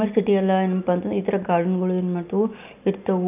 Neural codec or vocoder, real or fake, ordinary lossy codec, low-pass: none; real; none; 3.6 kHz